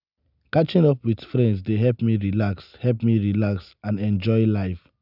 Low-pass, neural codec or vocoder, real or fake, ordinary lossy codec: 5.4 kHz; none; real; none